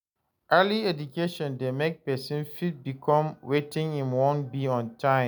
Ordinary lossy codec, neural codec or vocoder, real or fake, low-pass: none; none; real; none